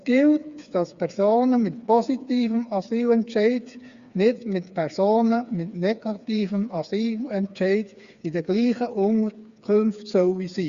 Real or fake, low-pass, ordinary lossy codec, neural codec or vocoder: fake; 7.2 kHz; Opus, 64 kbps; codec, 16 kHz, 4 kbps, FreqCodec, smaller model